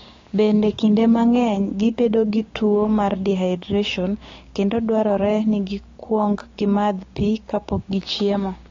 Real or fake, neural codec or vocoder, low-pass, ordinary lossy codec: fake; codec, 16 kHz, 6 kbps, DAC; 7.2 kHz; AAC, 32 kbps